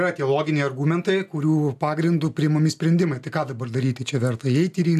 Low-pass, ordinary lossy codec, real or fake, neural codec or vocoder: 14.4 kHz; AAC, 96 kbps; real; none